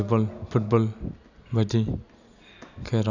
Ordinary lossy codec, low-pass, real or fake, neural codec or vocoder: none; 7.2 kHz; real; none